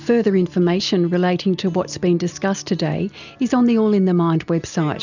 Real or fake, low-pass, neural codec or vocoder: real; 7.2 kHz; none